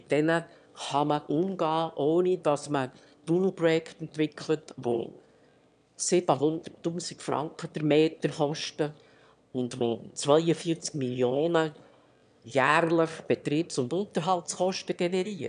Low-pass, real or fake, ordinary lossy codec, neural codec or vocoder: 9.9 kHz; fake; none; autoencoder, 22.05 kHz, a latent of 192 numbers a frame, VITS, trained on one speaker